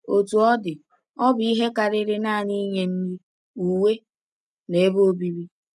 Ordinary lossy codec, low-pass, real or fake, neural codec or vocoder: none; none; real; none